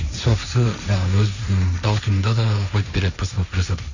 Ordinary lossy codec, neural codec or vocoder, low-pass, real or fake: none; codec, 16 kHz, 1.1 kbps, Voila-Tokenizer; 7.2 kHz; fake